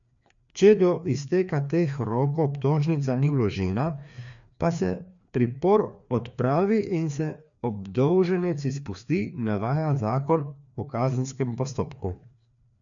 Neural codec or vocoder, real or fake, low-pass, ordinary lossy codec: codec, 16 kHz, 2 kbps, FreqCodec, larger model; fake; 7.2 kHz; none